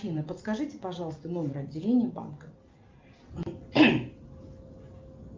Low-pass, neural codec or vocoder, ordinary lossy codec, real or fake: 7.2 kHz; none; Opus, 24 kbps; real